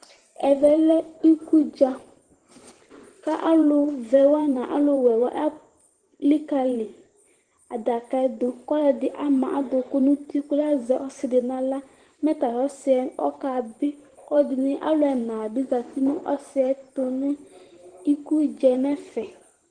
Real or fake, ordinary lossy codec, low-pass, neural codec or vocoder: fake; Opus, 16 kbps; 9.9 kHz; vocoder, 44.1 kHz, 128 mel bands every 512 samples, BigVGAN v2